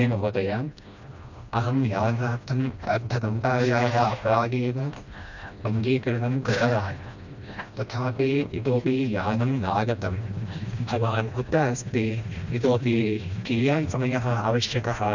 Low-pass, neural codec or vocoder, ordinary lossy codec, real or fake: 7.2 kHz; codec, 16 kHz, 1 kbps, FreqCodec, smaller model; none; fake